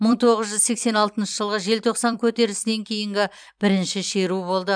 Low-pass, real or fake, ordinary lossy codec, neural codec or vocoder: 9.9 kHz; fake; none; vocoder, 44.1 kHz, 128 mel bands every 256 samples, BigVGAN v2